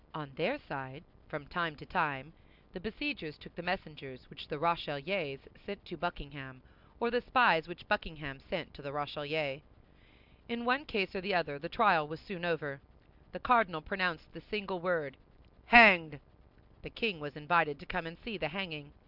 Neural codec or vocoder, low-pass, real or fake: none; 5.4 kHz; real